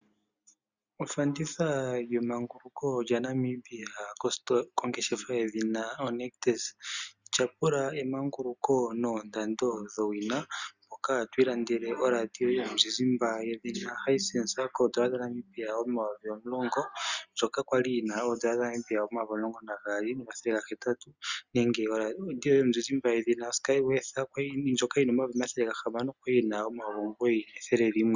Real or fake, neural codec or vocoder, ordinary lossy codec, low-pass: real; none; Opus, 64 kbps; 7.2 kHz